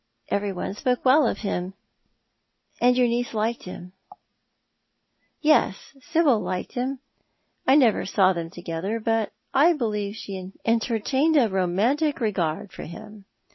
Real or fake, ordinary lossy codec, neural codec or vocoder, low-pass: real; MP3, 24 kbps; none; 7.2 kHz